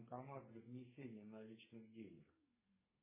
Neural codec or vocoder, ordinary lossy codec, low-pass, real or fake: codec, 32 kHz, 1.9 kbps, SNAC; MP3, 16 kbps; 3.6 kHz; fake